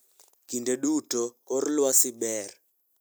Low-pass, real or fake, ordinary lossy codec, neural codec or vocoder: none; real; none; none